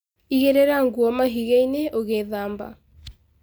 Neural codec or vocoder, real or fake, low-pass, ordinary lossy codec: none; real; none; none